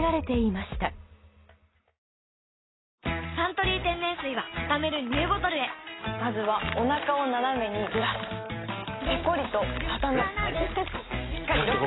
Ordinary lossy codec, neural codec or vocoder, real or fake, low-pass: AAC, 16 kbps; none; real; 7.2 kHz